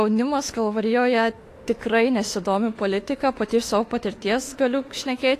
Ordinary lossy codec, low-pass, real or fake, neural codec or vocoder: AAC, 48 kbps; 14.4 kHz; fake; autoencoder, 48 kHz, 32 numbers a frame, DAC-VAE, trained on Japanese speech